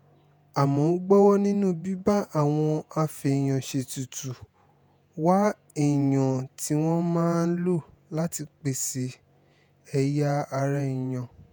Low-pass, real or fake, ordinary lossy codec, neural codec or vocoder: none; fake; none; vocoder, 48 kHz, 128 mel bands, Vocos